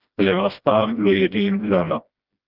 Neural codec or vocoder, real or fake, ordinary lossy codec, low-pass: codec, 16 kHz, 1 kbps, FreqCodec, smaller model; fake; Opus, 24 kbps; 5.4 kHz